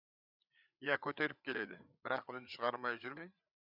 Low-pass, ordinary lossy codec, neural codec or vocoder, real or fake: 5.4 kHz; none; codec, 16 kHz, 8 kbps, FreqCodec, larger model; fake